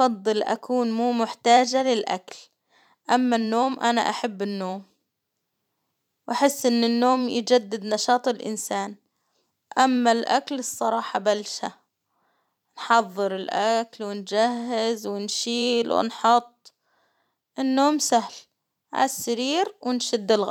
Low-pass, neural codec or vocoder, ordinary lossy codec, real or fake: 19.8 kHz; vocoder, 44.1 kHz, 128 mel bands every 512 samples, BigVGAN v2; none; fake